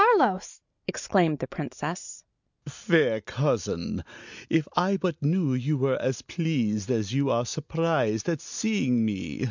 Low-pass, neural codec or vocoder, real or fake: 7.2 kHz; none; real